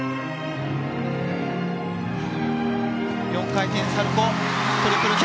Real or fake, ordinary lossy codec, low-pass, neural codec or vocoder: real; none; none; none